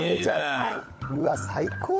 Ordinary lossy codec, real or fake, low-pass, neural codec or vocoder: none; fake; none; codec, 16 kHz, 16 kbps, FunCodec, trained on LibriTTS, 50 frames a second